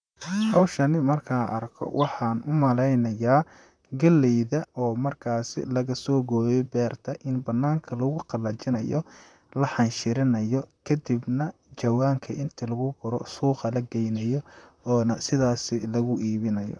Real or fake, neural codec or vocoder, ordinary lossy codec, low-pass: fake; vocoder, 44.1 kHz, 128 mel bands, Pupu-Vocoder; none; 9.9 kHz